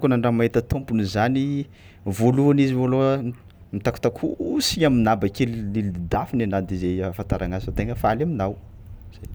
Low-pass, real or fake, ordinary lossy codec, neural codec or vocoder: none; real; none; none